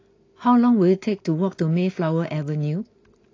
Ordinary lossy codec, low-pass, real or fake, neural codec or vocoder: AAC, 32 kbps; 7.2 kHz; real; none